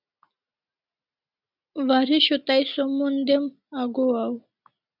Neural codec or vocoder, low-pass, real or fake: none; 5.4 kHz; real